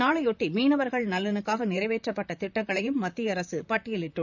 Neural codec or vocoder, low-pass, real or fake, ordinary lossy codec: vocoder, 44.1 kHz, 128 mel bands, Pupu-Vocoder; 7.2 kHz; fake; none